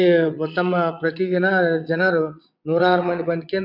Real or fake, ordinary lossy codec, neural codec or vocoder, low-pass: fake; MP3, 48 kbps; vocoder, 22.05 kHz, 80 mel bands, WaveNeXt; 5.4 kHz